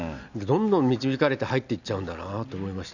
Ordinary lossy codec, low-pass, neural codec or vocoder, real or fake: none; 7.2 kHz; none; real